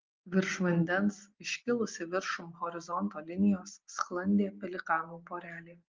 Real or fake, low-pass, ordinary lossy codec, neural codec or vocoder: real; 7.2 kHz; Opus, 32 kbps; none